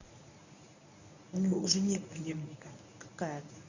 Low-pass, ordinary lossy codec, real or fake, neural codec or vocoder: 7.2 kHz; none; fake; codec, 24 kHz, 0.9 kbps, WavTokenizer, medium speech release version 1